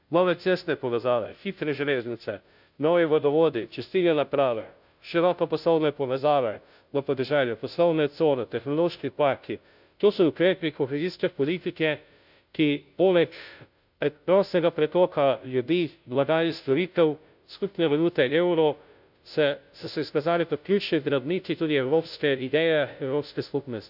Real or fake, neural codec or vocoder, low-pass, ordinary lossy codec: fake; codec, 16 kHz, 0.5 kbps, FunCodec, trained on Chinese and English, 25 frames a second; 5.4 kHz; none